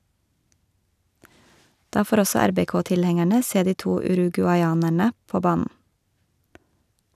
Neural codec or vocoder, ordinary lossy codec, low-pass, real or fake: none; none; 14.4 kHz; real